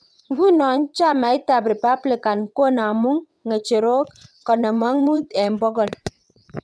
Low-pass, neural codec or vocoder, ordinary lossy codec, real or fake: none; vocoder, 22.05 kHz, 80 mel bands, WaveNeXt; none; fake